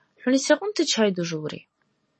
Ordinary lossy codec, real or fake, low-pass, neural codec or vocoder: MP3, 32 kbps; real; 10.8 kHz; none